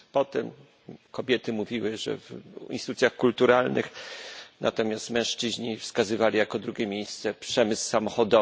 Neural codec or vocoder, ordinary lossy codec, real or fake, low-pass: none; none; real; none